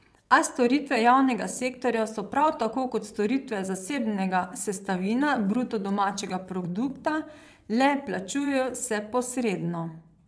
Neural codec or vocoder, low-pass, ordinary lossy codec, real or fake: vocoder, 22.05 kHz, 80 mel bands, WaveNeXt; none; none; fake